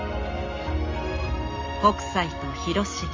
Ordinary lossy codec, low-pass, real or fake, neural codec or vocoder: MP3, 32 kbps; 7.2 kHz; real; none